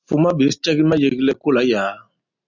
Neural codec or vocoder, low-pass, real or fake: none; 7.2 kHz; real